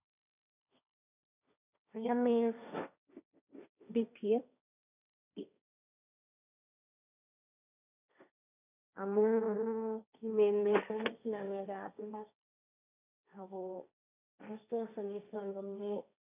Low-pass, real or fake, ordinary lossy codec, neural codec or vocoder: 3.6 kHz; fake; none; codec, 16 kHz, 1.1 kbps, Voila-Tokenizer